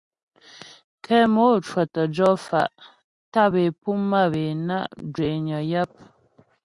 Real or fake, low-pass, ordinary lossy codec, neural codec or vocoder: real; 10.8 kHz; Opus, 64 kbps; none